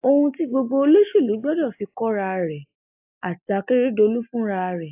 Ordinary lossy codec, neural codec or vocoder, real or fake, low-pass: AAC, 32 kbps; none; real; 3.6 kHz